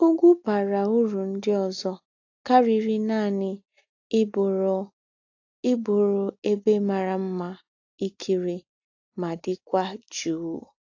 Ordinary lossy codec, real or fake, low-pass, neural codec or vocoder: AAC, 48 kbps; real; 7.2 kHz; none